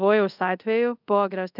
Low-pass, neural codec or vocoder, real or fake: 5.4 kHz; codec, 24 kHz, 0.5 kbps, DualCodec; fake